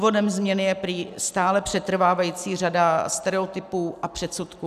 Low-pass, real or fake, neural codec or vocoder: 14.4 kHz; real; none